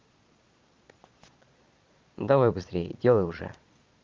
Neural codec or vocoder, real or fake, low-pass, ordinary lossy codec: vocoder, 22.05 kHz, 80 mel bands, Vocos; fake; 7.2 kHz; Opus, 32 kbps